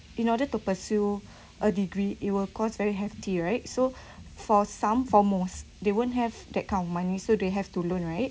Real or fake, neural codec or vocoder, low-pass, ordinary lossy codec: real; none; none; none